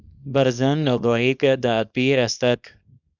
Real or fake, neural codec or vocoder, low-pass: fake; codec, 24 kHz, 0.9 kbps, WavTokenizer, small release; 7.2 kHz